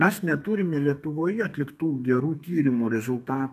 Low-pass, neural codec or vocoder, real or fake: 14.4 kHz; codec, 32 kHz, 1.9 kbps, SNAC; fake